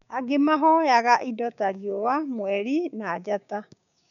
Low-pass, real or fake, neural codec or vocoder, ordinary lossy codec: 7.2 kHz; fake; codec, 16 kHz, 6 kbps, DAC; none